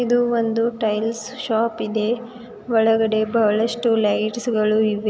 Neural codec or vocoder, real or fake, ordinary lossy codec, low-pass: none; real; none; none